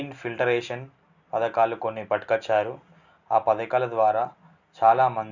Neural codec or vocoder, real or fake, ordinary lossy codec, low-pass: none; real; none; 7.2 kHz